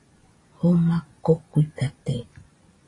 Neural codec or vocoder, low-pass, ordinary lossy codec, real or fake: vocoder, 24 kHz, 100 mel bands, Vocos; 10.8 kHz; AAC, 32 kbps; fake